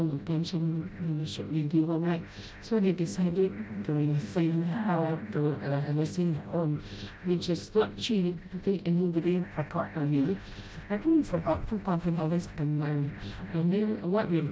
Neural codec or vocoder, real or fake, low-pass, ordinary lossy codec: codec, 16 kHz, 0.5 kbps, FreqCodec, smaller model; fake; none; none